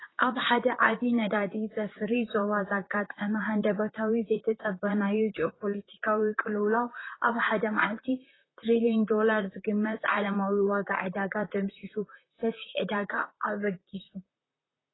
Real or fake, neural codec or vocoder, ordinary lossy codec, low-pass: fake; vocoder, 44.1 kHz, 128 mel bands, Pupu-Vocoder; AAC, 16 kbps; 7.2 kHz